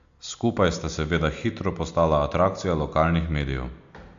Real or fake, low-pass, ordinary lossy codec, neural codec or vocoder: real; 7.2 kHz; AAC, 64 kbps; none